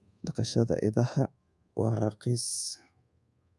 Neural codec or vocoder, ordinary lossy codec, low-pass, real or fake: codec, 24 kHz, 1.2 kbps, DualCodec; none; none; fake